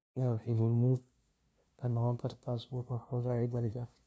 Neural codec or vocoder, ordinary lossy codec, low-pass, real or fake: codec, 16 kHz, 0.5 kbps, FunCodec, trained on LibriTTS, 25 frames a second; none; none; fake